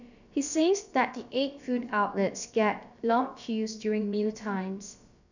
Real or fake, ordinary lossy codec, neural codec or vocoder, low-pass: fake; none; codec, 16 kHz, about 1 kbps, DyCAST, with the encoder's durations; 7.2 kHz